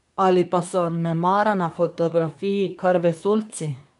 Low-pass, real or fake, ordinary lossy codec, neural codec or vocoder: 10.8 kHz; fake; none; codec, 24 kHz, 1 kbps, SNAC